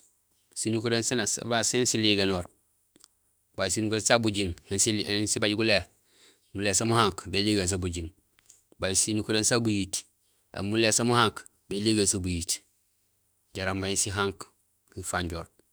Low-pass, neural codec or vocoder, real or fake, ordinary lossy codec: none; autoencoder, 48 kHz, 32 numbers a frame, DAC-VAE, trained on Japanese speech; fake; none